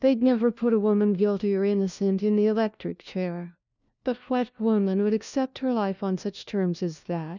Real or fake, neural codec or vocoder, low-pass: fake; codec, 16 kHz, 1 kbps, FunCodec, trained on LibriTTS, 50 frames a second; 7.2 kHz